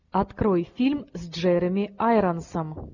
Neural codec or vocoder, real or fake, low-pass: none; real; 7.2 kHz